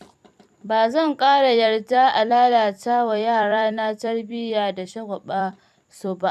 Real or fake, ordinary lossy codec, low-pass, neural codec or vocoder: fake; none; 14.4 kHz; vocoder, 44.1 kHz, 128 mel bands every 256 samples, BigVGAN v2